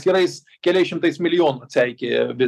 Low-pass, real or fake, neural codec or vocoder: 14.4 kHz; real; none